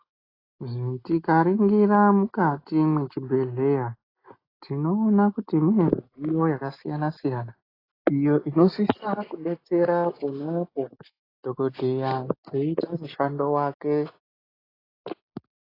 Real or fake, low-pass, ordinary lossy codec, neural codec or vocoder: real; 5.4 kHz; AAC, 24 kbps; none